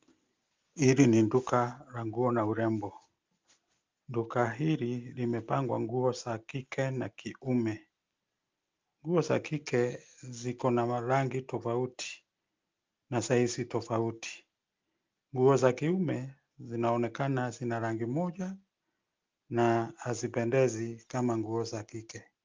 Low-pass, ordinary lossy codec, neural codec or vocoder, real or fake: 7.2 kHz; Opus, 24 kbps; none; real